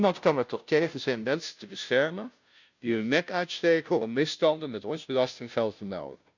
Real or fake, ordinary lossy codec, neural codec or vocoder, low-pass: fake; none; codec, 16 kHz, 0.5 kbps, FunCodec, trained on Chinese and English, 25 frames a second; 7.2 kHz